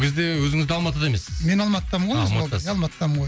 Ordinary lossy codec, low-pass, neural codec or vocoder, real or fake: none; none; none; real